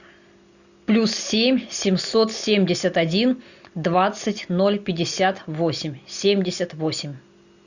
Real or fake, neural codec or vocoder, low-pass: real; none; 7.2 kHz